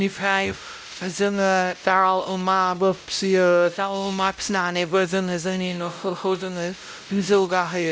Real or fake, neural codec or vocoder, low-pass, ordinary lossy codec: fake; codec, 16 kHz, 0.5 kbps, X-Codec, WavLM features, trained on Multilingual LibriSpeech; none; none